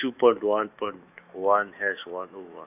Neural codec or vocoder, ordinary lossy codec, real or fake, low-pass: autoencoder, 48 kHz, 128 numbers a frame, DAC-VAE, trained on Japanese speech; none; fake; 3.6 kHz